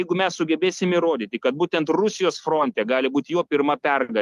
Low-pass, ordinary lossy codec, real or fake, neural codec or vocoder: 14.4 kHz; Opus, 32 kbps; real; none